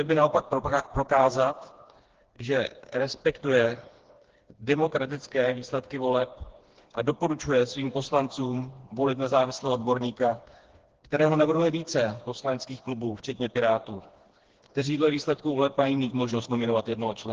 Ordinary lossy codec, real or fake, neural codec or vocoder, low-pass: Opus, 16 kbps; fake; codec, 16 kHz, 2 kbps, FreqCodec, smaller model; 7.2 kHz